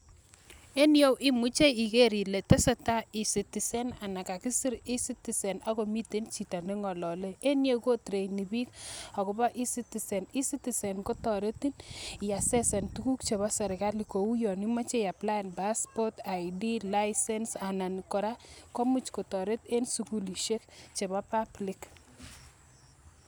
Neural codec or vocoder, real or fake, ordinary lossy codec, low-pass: none; real; none; none